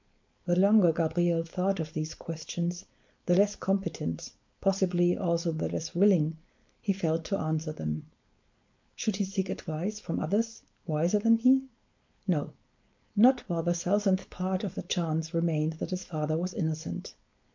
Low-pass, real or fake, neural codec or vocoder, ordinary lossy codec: 7.2 kHz; fake; codec, 16 kHz, 4.8 kbps, FACodec; MP3, 48 kbps